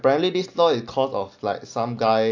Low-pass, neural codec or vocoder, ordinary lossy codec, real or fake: 7.2 kHz; none; AAC, 48 kbps; real